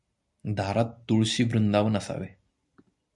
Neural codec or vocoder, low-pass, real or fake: none; 10.8 kHz; real